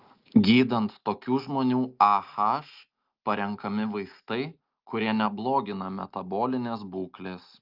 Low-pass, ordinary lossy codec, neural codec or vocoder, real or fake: 5.4 kHz; Opus, 32 kbps; none; real